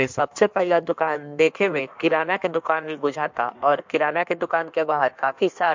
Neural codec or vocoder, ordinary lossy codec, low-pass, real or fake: codec, 16 kHz in and 24 kHz out, 1.1 kbps, FireRedTTS-2 codec; MP3, 64 kbps; 7.2 kHz; fake